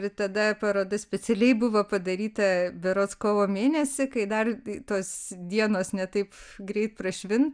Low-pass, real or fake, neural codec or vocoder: 9.9 kHz; real; none